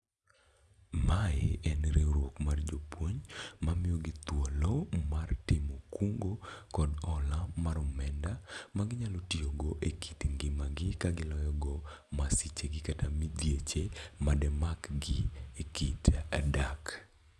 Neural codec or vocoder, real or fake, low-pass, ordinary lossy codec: none; real; none; none